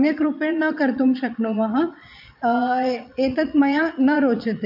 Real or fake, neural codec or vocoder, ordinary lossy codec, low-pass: fake; vocoder, 22.05 kHz, 80 mel bands, Vocos; AAC, 48 kbps; 5.4 kHz